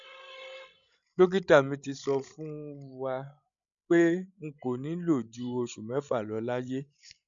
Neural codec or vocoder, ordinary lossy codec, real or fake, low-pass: codec, 16 kHz, 16 kbps, FreqCodec, larger model; none; fake; 7.2 kHz